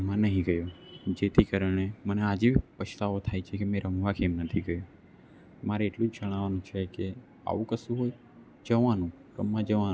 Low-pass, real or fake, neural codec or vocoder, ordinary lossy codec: none; real; none; none